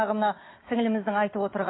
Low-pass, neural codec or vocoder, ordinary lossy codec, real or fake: 7.2 kHz; none; AAC, 16 kbps; real